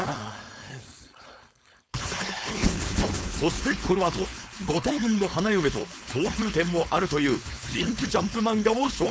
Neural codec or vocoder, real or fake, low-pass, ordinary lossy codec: codec, 16 kHz, 4.8 kbps, FACodec; fake; none; none